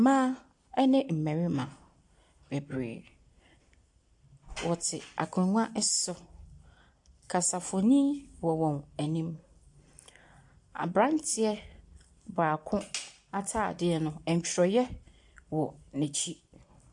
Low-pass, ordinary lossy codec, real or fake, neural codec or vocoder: 10.8 kHz; AAC, 64 kbps; real; none